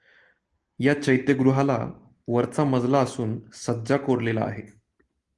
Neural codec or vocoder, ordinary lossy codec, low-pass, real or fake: none; Opus, 24 kbps; 10.8 kHz; real